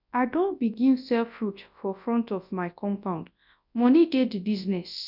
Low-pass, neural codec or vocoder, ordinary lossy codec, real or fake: 5.4 kHz; codec, 24 kHz, 0.9 kbps, WavTokenizer, large speech release; none; fake